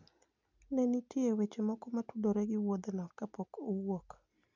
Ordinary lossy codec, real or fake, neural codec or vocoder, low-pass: none; real; none; 7.2 kHz